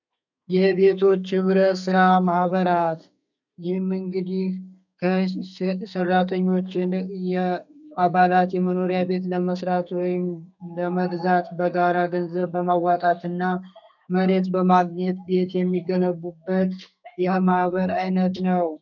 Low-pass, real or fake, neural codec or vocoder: 7.2 kHz; fake; codec, 32 kHz, 1.9 kbps, SNAC